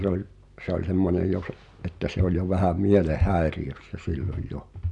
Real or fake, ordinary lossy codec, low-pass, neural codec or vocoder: real; none; 10.8 kHz; none